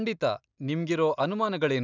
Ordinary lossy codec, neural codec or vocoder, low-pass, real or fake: none; none; 7.2 kHz; real